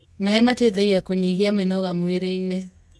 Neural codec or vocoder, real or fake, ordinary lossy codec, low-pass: codec, 24 kHz, 0.9 kbps, WavTokenizer, medium music audio release; fake; none; none